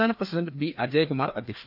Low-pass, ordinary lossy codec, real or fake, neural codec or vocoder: 5.4 kHz; none; fake; codec, 16 kHz, 2 kbps, FreqCodec, larger model